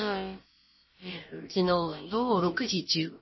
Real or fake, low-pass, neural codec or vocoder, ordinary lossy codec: fake; 7.2 kHz; codec, 16 kHz, about 1 kbps, DyCAST, with the encoder's durations; MP3, 24 kbps